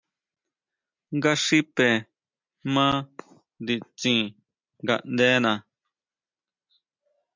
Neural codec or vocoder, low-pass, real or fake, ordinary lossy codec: none; 7.2 kHz; real; MP3, 64 kbps